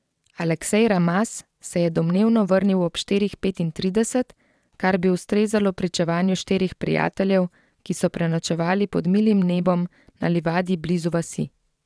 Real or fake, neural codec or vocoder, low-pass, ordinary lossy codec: fake; vocoder, 22.05 kHz, 80 mel bands, WaveNeXt; none; none